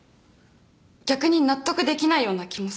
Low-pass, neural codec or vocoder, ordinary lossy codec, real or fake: none; none; none; real